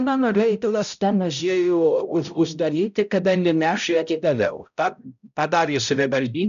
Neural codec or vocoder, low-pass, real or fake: codec, 16 kHz, 0.5 kbps, X-Codec, HuBERT features, trained on balanced general audio; 7.2 kHz; fake